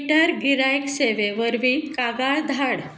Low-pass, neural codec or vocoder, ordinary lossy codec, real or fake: none; none; none; real